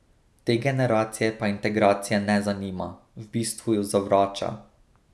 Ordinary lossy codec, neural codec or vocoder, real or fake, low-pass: none; none; real; none